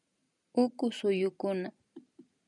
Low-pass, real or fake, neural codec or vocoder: 10.8 kHz; real; none